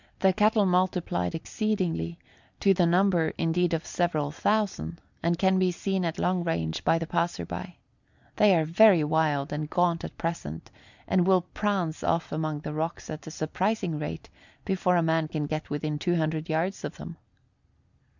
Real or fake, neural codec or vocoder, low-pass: real; none; 7.2 kHz